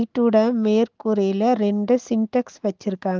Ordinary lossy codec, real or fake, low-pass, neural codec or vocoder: Opus, 32 kbps; real; 7.2 kHz; none